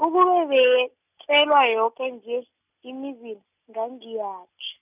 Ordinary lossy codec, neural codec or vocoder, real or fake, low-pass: none; none; real; 3.6 kHz